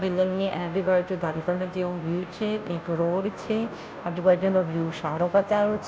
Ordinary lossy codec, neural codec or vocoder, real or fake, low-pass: none; codec, 16 kHz, 0.5 kbps, FunCodec, trained on Chinese and English, 25 frames a second; fake; none